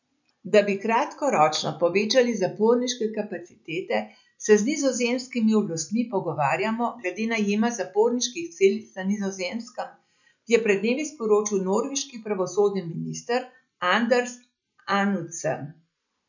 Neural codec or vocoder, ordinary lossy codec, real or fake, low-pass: none; none; real; 7.2 kHz